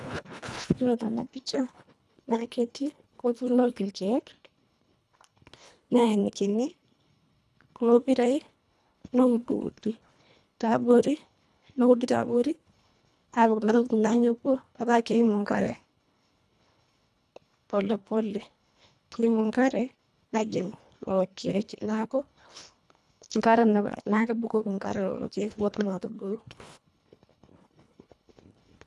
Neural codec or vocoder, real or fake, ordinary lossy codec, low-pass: codec, 24 kHz, 1.5 kbps, HILCodec; fake; none; none